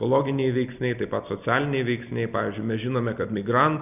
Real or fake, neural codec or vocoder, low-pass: real; none; 3.6 kHz